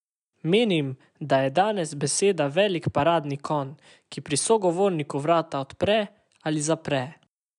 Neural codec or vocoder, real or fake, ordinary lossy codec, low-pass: none; real; none; 10.8 kHz